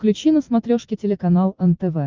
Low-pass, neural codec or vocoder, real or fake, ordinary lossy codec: 7.2 kHz; none; real; Opus, 24 kbps